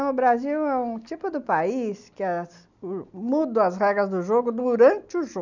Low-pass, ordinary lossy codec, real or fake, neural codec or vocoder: 7.2 kHz; none; real; none